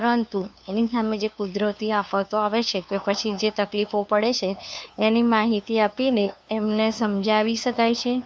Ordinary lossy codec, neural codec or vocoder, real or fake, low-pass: none; codec, 16 kHz, 2 kbps, FunCodec, trained on LibriTTS, 25 frames a second; fake; none